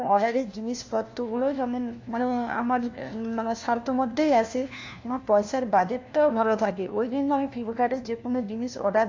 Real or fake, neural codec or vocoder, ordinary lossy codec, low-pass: fake; codec, 16 kHz, 1 kbps, FunCodec, trained on LibriTTS, 50 frames a second; AAC, 48 kbps; 7.2 kHz